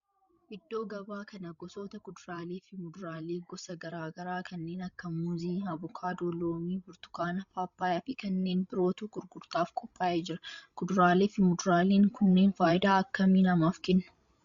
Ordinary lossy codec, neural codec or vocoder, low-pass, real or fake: Opus, 64 kbps; codec, 16 kHz, 16 kbps, FreqCodec, larger model; 7.2 kHz; fake